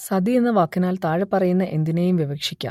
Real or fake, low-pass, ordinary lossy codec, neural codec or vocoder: real; 19.8 kHz; MP3, 64 kbps; none